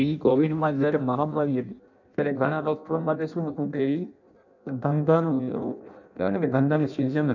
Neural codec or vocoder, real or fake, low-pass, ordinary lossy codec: codec, 16 kHz in and 24 kHz out, 0.6 kbps, FireRedTTS-2 codec; fake; 7.2 kHz; none